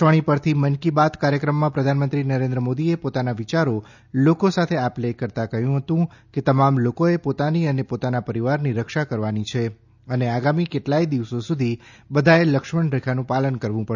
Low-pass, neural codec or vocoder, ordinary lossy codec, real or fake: 7.2 kHz; none; none; real